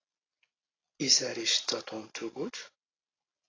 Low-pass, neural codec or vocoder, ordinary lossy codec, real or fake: 7.2 kHz; none; AAC, 32 kbps; real